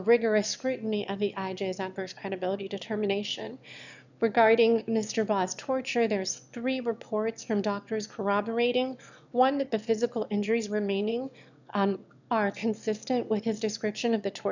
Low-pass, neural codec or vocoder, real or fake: 7.2 kHz; autoencoder, 22.05 kHz, a latent of 192 numbers a frame, VITS, trained on one speaker; fake